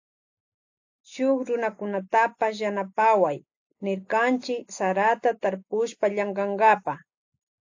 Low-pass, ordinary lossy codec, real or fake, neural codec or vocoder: 7.2 kHz; AAC, 48 kbps; real; none